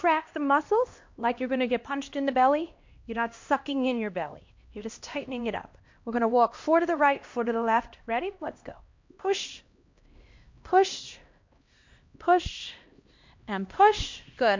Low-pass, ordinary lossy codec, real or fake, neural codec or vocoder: 7.2 kHz; MP3, 48 kbps; fake; codec, 16 kHz, 1 kbps, X-Codec, HuBERT features, trained on LibriSpeech